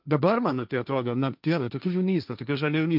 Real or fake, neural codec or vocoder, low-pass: fake; codec, 16 kHz, 1.1 kbps, Voila-Tokenizer; 5.4 kHz